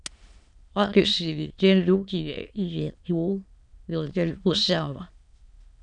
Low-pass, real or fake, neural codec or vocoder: 9.9 kHz; fake; autoencoder, 22.05 kHz, a latent of 192 numbers a frame, VITS, trained on many speakers